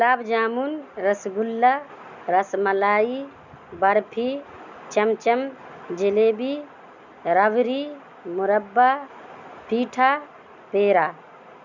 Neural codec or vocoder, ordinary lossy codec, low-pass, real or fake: none; none; 7.2 kHz; real